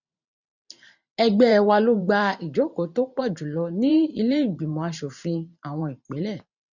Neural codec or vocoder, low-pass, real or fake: vocoder, 44.1 kHz, 128 mel bands every 512 samples, BigVGAN v2; 7.2 kHz; fake